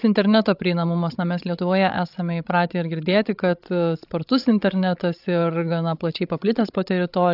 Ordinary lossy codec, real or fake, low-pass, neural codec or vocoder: AAC, 48 kbps; fake; 5.4 kHz; codec, 16 kHz, 16 kbps, FreqCodec, larger model